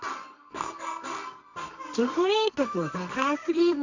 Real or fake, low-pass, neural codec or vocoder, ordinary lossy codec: fake; 7.2 kHz; codec, 24 kHz, 0.9 kbps, WavTokenizer, medium music audio release; none